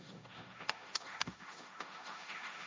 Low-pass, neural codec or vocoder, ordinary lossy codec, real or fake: none; codec, 16 kHz, 1.1 kbps, Voila-Tokenizer; none; fake